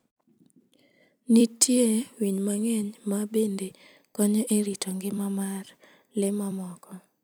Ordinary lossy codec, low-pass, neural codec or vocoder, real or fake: none; none; vocoder, 44.1 kHz, 128 mel bands every 256 samples, BigVGAN v2; fake